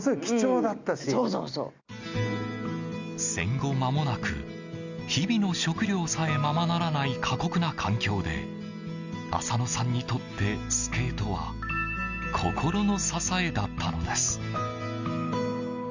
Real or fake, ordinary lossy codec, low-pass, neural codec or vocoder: real; Opus, 64 kbps; 7.2 kHz; none